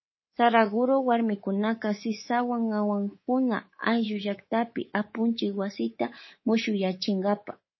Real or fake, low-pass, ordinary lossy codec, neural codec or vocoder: fake; 7.2 kHz; MP3, 24 kbps; codec, 24 kHz, 3.1 kbps, DualCodec